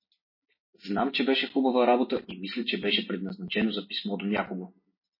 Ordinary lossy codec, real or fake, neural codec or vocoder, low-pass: MP3, 24 kbps; real; none; 5.4 kHz